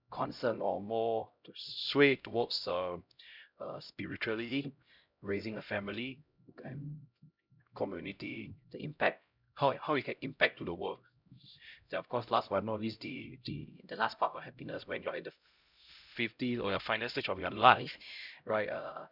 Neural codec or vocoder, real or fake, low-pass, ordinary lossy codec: codec, 16 kHz, 0.5 kbps, X-Codec, HuBERT features, trained on LibriSpeech; fake; 5.4 kHz; none